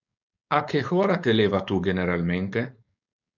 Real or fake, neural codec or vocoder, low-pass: fake; codec, 16 kHz, 4.8 kbps, FACodec; 7.2 kHz